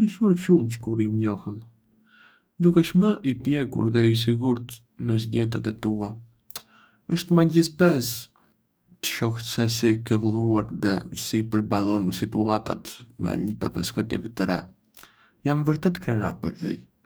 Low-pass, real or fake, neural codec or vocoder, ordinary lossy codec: none; fake; codec, 44.1 kHz, 2.6 kbps, DAC; none